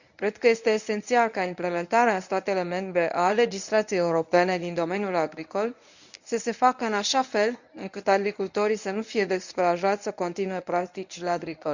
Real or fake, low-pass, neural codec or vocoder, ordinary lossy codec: fake; 7.2 kHz; codec, 24 kHz, 0.9 kbps, WavTokenizer, medium speech release version 1; none